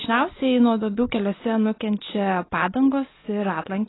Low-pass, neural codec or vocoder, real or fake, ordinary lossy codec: 7.2 kHz; none; real; AAC, 16 kbps